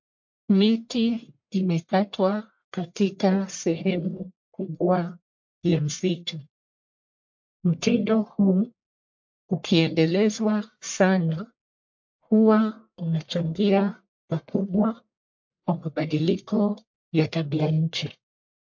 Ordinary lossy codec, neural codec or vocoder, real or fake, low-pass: MP3, 48 kbps; codec, 44.1 kHz, 1.7 kbps, Pupu-Codec; fake; 7.2 kHz